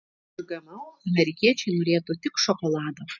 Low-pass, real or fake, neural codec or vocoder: 7.2 kHz; real; none